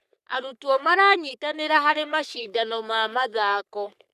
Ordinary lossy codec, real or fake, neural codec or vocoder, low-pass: none; fake; codec, 44.1 kHz, 3.4 kbps, Pupu-Codec; 14.4 kHz